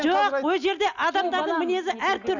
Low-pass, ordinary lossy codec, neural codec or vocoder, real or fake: 7.2 kHz; none; none; real